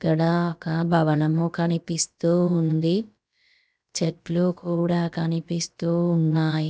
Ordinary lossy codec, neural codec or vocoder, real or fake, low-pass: none; codec, 16 kHz, about 1 kbps, DyCAST, with the encoder's durations; fake; none